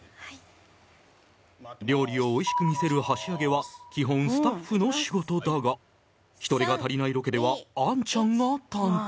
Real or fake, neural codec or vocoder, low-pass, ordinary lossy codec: real; none; none; none